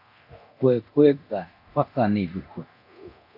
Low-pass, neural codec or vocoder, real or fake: 5.4 kHz; codec, 24 kHz, 0.9 kbps, DualCodec; fake